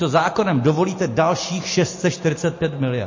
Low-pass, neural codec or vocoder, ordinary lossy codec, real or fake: 7.2 kHz; none; MP3, 32 kbps; real